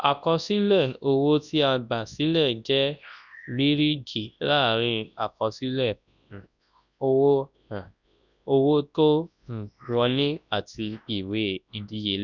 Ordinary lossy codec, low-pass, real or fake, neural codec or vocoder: none; 7.2 kHz; fake; codec, 24 kHz, 0.9 kbps, WavTokenizer, large speech release